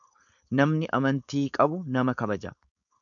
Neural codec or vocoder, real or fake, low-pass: codec, 16 kHz, 4.8 kbps, FACodec; fake; 7.2 kHz